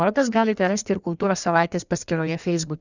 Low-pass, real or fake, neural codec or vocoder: 7.2 kHz; fake; codec, 16 kHz in and 24 kHz out, 1.1 kbps, FireRedTTS-2 codec